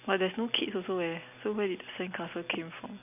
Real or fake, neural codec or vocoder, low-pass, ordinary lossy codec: real; none; 3.6 kHz; none